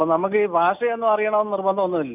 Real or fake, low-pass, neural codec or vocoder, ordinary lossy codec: real; 3.6 kHz; none; none